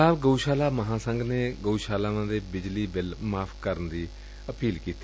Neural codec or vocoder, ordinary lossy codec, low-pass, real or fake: none; none; none; real